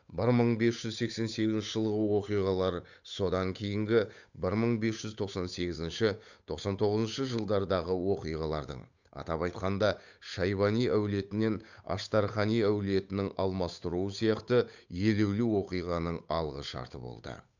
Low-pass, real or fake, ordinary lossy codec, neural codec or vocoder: 7.2 kHz; fake; none; codec, 16 kHz, 8 kbps, FunCodec, trained on Chinese and English, 25 frames a second